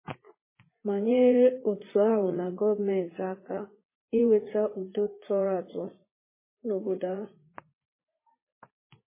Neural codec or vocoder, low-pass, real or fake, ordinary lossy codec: vocoder, 44.1 kHz, 80 mel bands, Vocos; 3.6 kHz; fake; MP3, 16 kbps